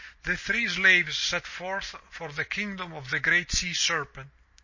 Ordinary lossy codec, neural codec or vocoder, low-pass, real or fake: MP3, 32 kbps; none; 7.2 kHz; real